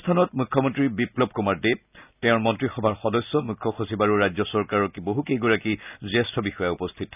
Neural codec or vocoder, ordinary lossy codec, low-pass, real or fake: none; none; 3.6 kHz; real